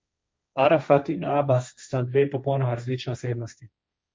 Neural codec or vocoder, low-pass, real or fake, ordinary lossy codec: codec, 16 kHz, 1.1 kbps, Voila-Tokenizer; none; fake; none